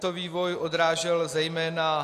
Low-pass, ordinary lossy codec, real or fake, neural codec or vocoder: 14.4 kHz; AAC, 64 kbps; real; none